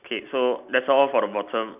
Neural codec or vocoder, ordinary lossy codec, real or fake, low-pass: none; none; real; 3.6 kHz